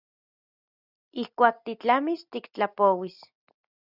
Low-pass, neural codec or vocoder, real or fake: 5.4 kHz; none; real